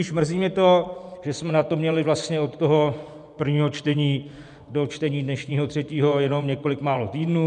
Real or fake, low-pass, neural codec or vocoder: fake; 10.8 kHz; vocoder, 24 kHz, 100 mel bands, Vocos